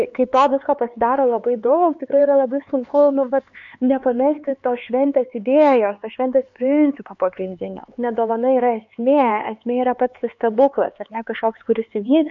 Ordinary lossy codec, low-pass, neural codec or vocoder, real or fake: MP3, 48 kbps; 7.2 kHz; codec, 16 kHz, 4 kbps, X-Codec, HuBERT features, trained on LibriSpeech; fake